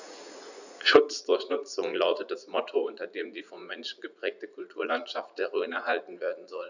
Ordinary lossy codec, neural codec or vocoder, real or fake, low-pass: none; vocoder, 44.1 kHz, 80 mel bands, Vocos; fake; 7.2 kHz